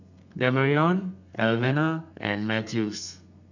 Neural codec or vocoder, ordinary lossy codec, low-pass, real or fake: codec, 32 kHz, 1.9 kbps, SNAC; none; 7.2 kHz; fake